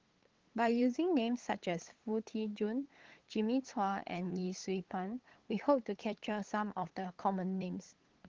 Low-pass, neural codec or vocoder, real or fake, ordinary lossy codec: 7.2 kHz; codec, 16 kHz, 8 kbps, FunCodec, trained on LibriTTS, 25 frames a second; fake; Opus, 16 kbps